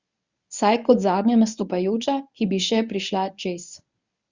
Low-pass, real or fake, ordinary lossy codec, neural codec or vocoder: 7.2 kHz; fake; Opus, 64 kbps; codec, 24 kHz, 0.9 kbps, WavTokenizer, medium speech release version 1